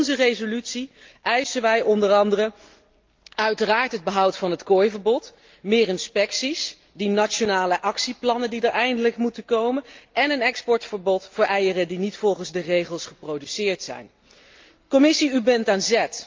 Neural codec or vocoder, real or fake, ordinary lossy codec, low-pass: none; real; Opus, 24 kbps; 7.2 kHz